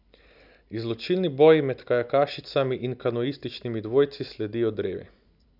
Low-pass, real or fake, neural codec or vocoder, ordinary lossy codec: 5.4 kHz; real; none; none